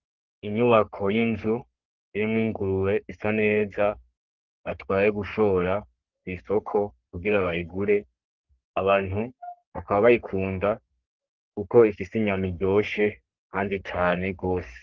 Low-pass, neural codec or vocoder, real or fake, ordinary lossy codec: 7.2 kHz; codec, 44.1 kHz, 3.4 kbps, Pupu-Codec; fake; Opus, 16 kbps